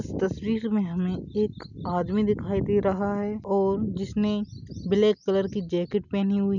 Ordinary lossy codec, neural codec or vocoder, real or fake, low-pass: none; none; real; 7.2 kHz